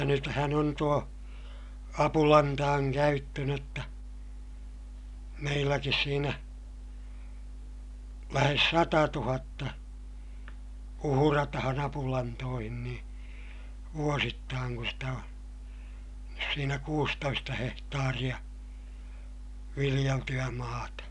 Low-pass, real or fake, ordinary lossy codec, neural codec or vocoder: 10.8 kHz; real; none; none